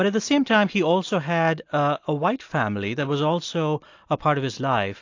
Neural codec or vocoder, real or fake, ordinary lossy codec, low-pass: none; real; AAC, 48 kbps; 7.2 kHz